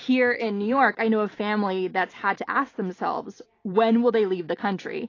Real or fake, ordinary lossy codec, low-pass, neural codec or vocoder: real; AAC, 32 kbps; 7.2 kHz; none